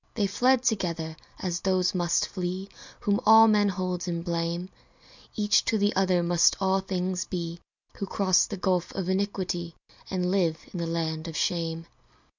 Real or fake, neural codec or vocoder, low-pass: real; none; 7.2 kHz